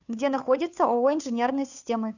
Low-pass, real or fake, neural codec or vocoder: 7.2 kHz; fake; codec, 16 kHz, 2 kbps, FunCodec, trained on Chinese and English, 25 frames a second